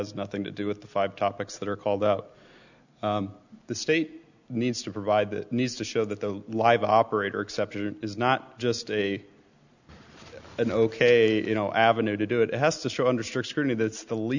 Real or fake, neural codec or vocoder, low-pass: real; none; 7.2 kHz